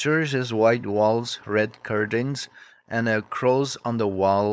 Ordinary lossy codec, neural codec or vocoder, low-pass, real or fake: none; codec, 16 kHz, 4.8 kbps, FACodec; none; fake